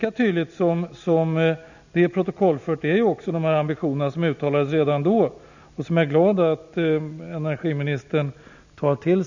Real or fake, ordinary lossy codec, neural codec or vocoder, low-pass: real; none; none; 7.2 kHz